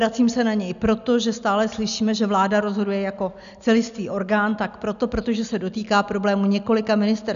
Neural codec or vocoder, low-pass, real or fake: none; 7.2 kHz; real